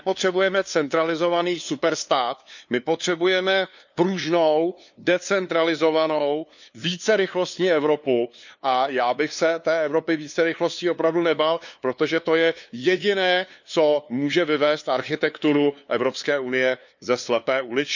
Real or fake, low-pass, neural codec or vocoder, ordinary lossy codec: fake; 7.2 kHz; codec, 16 kHz, 2 kbps, FunCodec, trained on LibriTTS, 25 frames a second; none